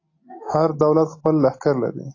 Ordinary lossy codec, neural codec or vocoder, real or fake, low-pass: AAC, 32 kbps; none; real; 7.2 kHz